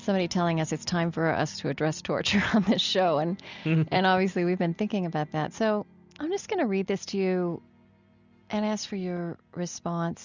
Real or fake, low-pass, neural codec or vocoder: real; 7.2 kHz; none